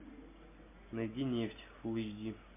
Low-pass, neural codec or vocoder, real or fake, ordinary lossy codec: 3.6 kHz; none; real; MP3, 24 kbps